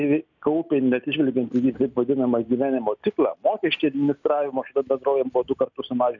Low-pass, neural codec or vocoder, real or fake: 7.2 kHz; none; real